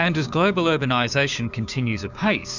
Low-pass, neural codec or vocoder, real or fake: 7.2 kHz; codec, 16 kHz, 6 kbps, DAC; fake